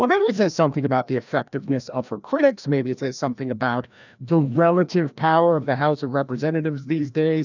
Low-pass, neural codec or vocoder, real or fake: 7.2 kHz; codec, 16 kHz, 1 kbps, FreqCodec, larger model; fake